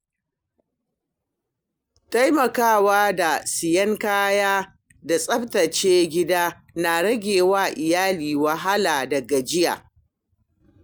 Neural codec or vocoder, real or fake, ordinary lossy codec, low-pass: none; real; none; none